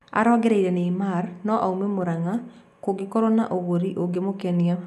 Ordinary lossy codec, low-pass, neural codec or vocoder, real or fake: none; 14.4 kHz; none; real